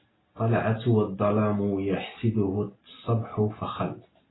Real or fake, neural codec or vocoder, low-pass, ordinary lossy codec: real; none; 7.2 kHz; AAC, 16 kbps